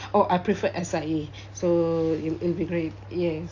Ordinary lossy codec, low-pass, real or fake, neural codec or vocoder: AAC, 48 kbps; 7.2 kHz; real; none